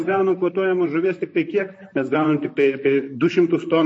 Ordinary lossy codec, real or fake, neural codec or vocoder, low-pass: MP3, 32 kbps; fake; vocoder, 44.1 kHz, 128 mel bands, Pupu-Vocoder; 9.9 kHz